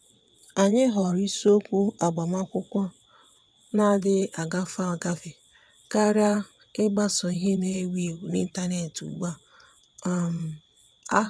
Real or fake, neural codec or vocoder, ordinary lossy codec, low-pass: fake; vocoder, 22.05 kHz, 80 mel bands, WaveNeXt; none; none